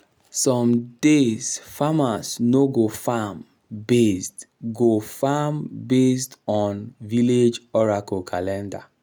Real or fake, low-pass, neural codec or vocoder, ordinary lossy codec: real; none; none; none